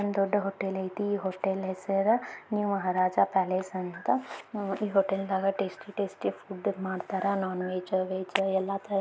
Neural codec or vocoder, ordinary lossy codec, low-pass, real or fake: none; none; none; real